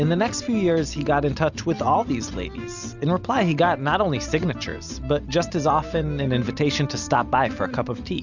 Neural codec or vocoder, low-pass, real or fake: none; 7.2 kHz; real